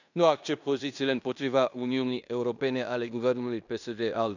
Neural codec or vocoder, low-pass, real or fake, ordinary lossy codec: codec, 16 kHz in and 24 kHz out, 0.9 kbps, LongCat-Audio-Codec, fine tuned four codebook decoder; 7.2 kHz; fake; none